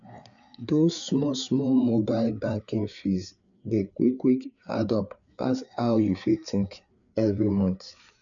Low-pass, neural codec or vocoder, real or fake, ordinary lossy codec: 7.2 kHz; codec, 16 kHz, 4 kbps, FreqCodec, larger model; fake; none